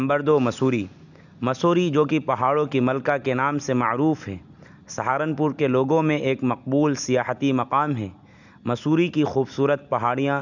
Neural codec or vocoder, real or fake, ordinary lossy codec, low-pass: none; real; none; 7.2 kHz